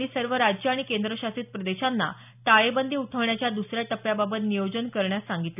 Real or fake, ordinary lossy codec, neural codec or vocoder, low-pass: real; none; none; 3.6 kHz